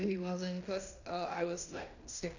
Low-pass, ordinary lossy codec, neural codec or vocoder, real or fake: 7.2 kHz; none; codec, 16 kHz in and 24 kHz out, 0.9 kbps, LongCat-Audio-Codec, fine tuned four codebook decoder; fake